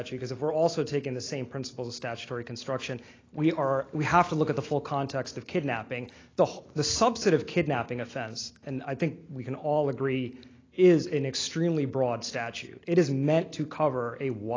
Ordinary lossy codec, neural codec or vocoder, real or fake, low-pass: AAC, 32 kbps; none; real; 7.2 kHz